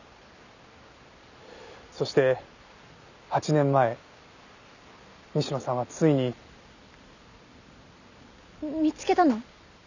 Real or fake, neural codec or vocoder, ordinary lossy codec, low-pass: real; none; none; 7.2 kHz